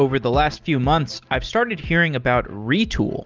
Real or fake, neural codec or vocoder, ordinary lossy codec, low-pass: real; none; Opus, 32 kbps; 7.2 kHz